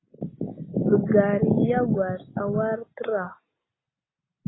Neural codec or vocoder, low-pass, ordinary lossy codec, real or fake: none; 7.2 kHz; AAC, 16 kbps; real